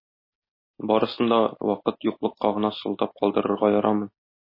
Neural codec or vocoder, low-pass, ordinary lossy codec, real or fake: none; 5.4 kHz; MP3, 24 kbps; real